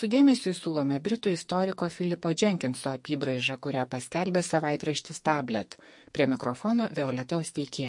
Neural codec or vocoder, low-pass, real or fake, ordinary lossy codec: codec, 44.1 kHz, 2.6 kbps, SNAC; 10.8 kHz; fake; MP3, 48 kbps